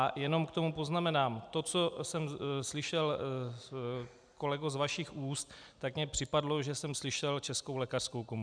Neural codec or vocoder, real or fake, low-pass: none; real; 9.9 kHz